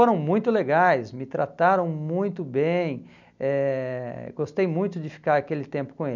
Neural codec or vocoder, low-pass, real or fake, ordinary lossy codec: none; 7.2 kHz; real; none